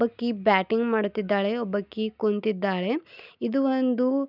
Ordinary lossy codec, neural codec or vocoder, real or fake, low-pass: none; none; real; 5.4 kHz